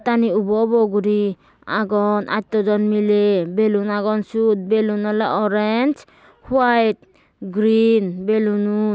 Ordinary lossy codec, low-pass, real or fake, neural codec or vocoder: none; none; real; none